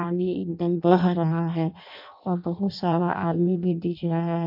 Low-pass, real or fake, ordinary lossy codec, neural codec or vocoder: 5.4 kHz; fake; MP3, 48 kbps; codec, 16 kHz in and 24 kHz out, 0.6 kbps, FireRedTTS-2 codec